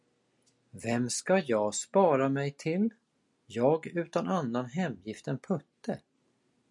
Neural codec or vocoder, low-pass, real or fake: none; 10.8 kHz; real